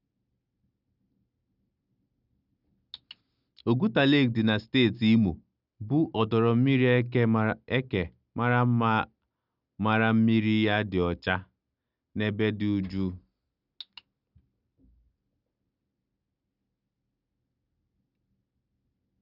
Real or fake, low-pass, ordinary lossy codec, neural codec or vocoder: real; 5.4 kHz; none; none